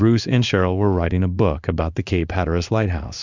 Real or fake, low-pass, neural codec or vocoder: fake; 7.2 kHz; codec, 16 kHz in and 24 kHz out, 1 kbps, XY-Tokenizer